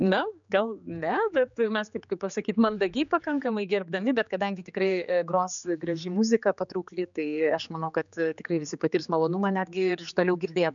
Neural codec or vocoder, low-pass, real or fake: codec, 16 kHz, 4 kbps, X-Codec, HuBERT features, trained on general audio; 7.2 kHz; fake